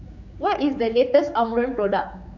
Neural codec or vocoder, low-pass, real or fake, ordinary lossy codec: codec, 16 kHz, 4 kbps, X-Codec, HuBERT features, trained on general audio; 7.2 kHz; fake; none